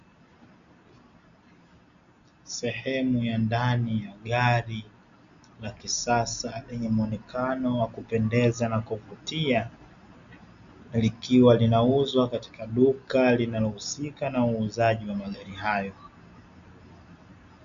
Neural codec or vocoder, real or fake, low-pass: none; real; 7.2 kHz